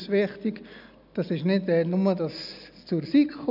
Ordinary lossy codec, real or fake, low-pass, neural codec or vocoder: none; real; 5.4 kHz; none